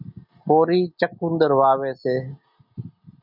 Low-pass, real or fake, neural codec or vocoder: 5.4 kHz; real; none